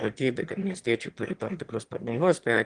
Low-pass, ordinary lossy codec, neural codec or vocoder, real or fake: 9.9 kHz; Opus, 24 kbps; autoencoder, 22.05 kHz, a latent of 192 numbers a frame, VITS, trained on one speaker; fake